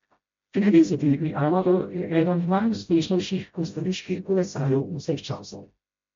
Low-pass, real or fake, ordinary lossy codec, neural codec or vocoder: 7.2 kHz; fake; MP3, 48 kbps; codec, 16 kHz, 0.5 kbps, FreqCodec, smaller model